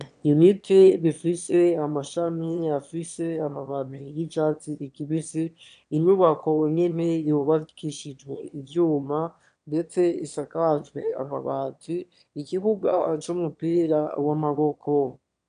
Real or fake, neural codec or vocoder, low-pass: fake; autoencoder, 22.05 kHz, a latent of 192 numbers a frame, VITS, trained on one speaker; 9.9 kHz